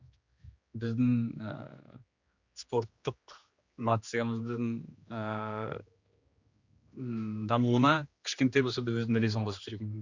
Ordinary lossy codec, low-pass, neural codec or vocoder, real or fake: Opus, 64 kbps; 7.2 kHz; codec, 16 kHz, 2 kbps, X-Codec, HuBERT features, trained on general audio; fake